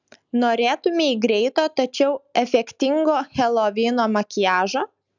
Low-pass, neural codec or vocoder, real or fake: 7.2 kHz; none; real